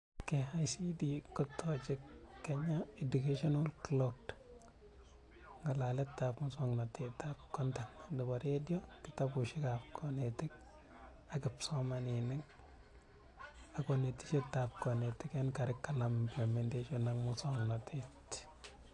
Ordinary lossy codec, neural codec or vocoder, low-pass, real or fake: AAC, 48 kbps; none; 10.8 kHz; real